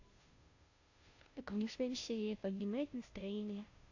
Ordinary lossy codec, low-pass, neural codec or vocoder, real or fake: AAC, 48 kbps; 7.2 kHz; codec, 16 kHz, 0.5 kbps, FunCodec, trained on Chinese and English, 25 frames a second; fake